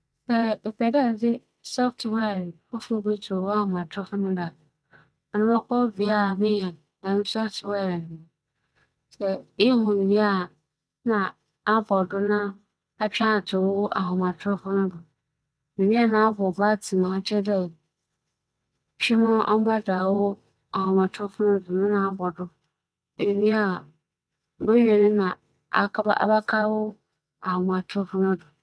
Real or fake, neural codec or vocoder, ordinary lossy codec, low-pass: fake; vocoder, 22.05 kHz, 80 mel bands, WaveNeXt; none; none